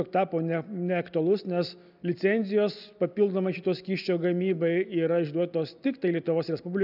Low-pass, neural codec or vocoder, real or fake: 5.4 kHz; none; real